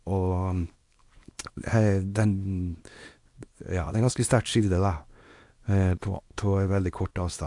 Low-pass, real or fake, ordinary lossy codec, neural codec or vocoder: 10.8 kHz; fake; AAC, 64 kbps; codec, 24 kHz, 0.9 kbps, WavTokenizer, small release